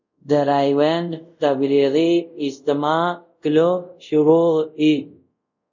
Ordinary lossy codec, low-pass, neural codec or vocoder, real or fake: MP3, 64 kbps; 7.2 kHz; codec, 24 kHz, 0.5 kbps, DualCodec; fake